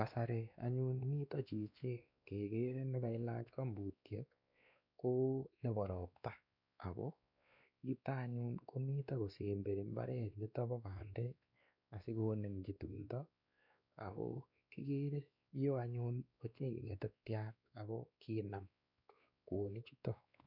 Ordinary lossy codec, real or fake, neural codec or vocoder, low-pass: none; fake; codec, 16 kHz, 2 kbps, X-Codec, WavLM features, trained on Multilingual LibriSpeech; 5.4 kHz